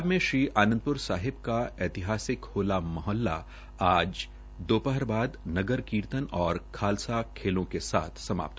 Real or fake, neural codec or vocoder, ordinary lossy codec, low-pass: real; none; none; none